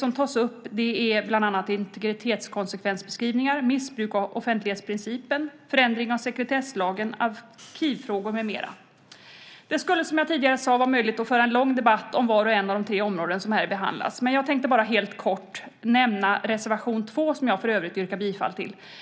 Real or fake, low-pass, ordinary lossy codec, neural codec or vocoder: real; none; none; none